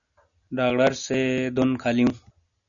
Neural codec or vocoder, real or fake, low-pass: none; real; 7.2 kHz